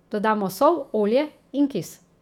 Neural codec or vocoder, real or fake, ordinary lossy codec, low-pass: autoencoder, 48 kHz, 128 numbers a frame, DAC-VAE, trained on Japanese speech; fake; none; 19.8 kHz